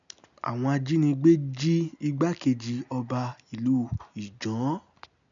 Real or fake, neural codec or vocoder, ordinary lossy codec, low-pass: real; none; none; 7.2 kHz